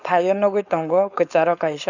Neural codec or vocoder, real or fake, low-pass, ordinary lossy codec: codec, 16 kHz, 4.8 kbps, FACodec; fake; 7.2 kHz; none